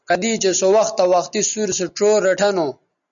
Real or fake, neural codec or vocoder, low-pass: real; none; 7.2 kHz